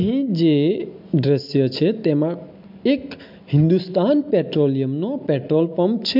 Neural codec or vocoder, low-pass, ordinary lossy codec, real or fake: none; 5.4 kHz; MP3, 48 kbps; real